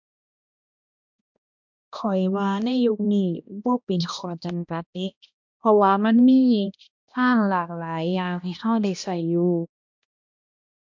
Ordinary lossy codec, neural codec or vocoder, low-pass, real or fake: MP3, 64 kbps; codec, 16 kHz, 1 kbps, X-Codec, HuBERT features, trained on balanced general audio; 7.2 kHz; fake